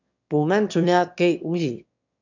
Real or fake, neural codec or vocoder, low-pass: fake; autoencoder, 22.05 kHz, a latent of 192 numbers a frame, VITS, trained on one speaker; 7.2 kHz